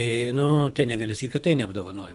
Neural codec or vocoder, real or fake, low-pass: codec, 24 kHz, 3 kbps, HILCodec; fake; 10.8 kHz